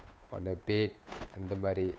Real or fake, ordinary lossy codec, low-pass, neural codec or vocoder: fake; none; none; codec, 16 kHz, 8 kbps, FunCodec, trained on Chinese and English, 25 frames a second